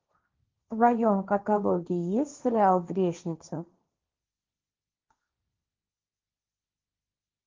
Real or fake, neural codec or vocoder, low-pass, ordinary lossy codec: fake; codec, 16 kHz, 1.1 kbps, Voila-Tokenizer; 7.2 kHz; Opus, 24 kbps